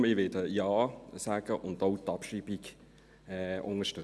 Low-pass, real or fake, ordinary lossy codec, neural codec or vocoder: none; real; none; none